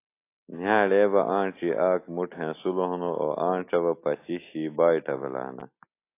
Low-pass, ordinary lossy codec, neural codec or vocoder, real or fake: 3.6 kHz; AAC, 24 kbps; none; real